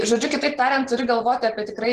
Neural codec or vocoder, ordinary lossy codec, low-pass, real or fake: none; Opus, 16 kbps; 14.4 kHz; real